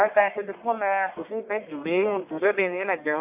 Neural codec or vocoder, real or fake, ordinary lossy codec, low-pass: codec, 44.1 kHz, 1.7 kbps, Pupu-Codec; fake; AAC, 32 kbps; 3.6 kHz